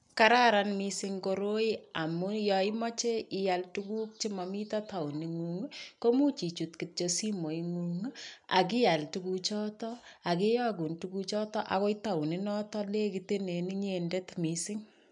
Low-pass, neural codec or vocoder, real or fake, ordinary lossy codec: 10.8 kHz; none; real; none